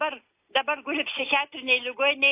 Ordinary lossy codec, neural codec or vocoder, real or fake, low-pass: AAC, 24 kbps; none; real; 3.6 kHz